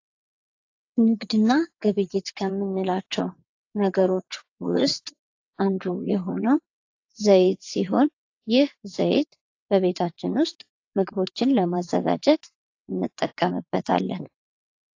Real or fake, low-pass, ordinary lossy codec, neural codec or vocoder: fake; 7.2 kHz; AAC, 48 kbps; vocoder, 22.05 kHz, 80 mel bands, WaveNeXt